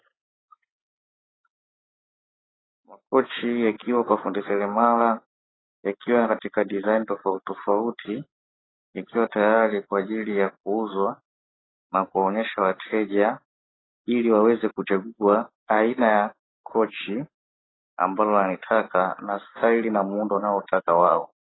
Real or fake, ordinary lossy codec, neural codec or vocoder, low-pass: real; AAC, 16 kbps; none; 7.2 kHz